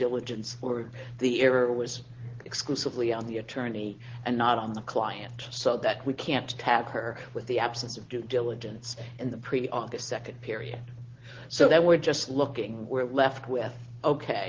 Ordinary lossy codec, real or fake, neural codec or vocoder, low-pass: Opus, 16 kbps; real; none; 7.2 kHz